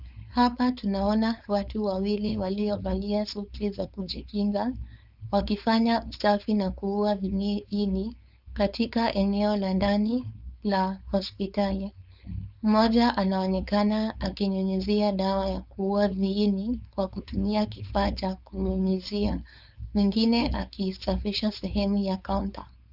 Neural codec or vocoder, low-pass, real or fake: codec, 16 kHz, 4.8 kbps, FACodec; 5.4 kHz; fake